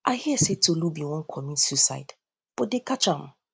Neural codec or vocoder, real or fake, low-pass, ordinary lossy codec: none; real; none; none